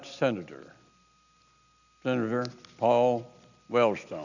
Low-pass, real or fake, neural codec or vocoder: 7.2 kHz; real; none